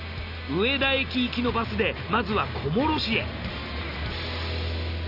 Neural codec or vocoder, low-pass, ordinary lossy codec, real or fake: none; 5.4 kHz; none; real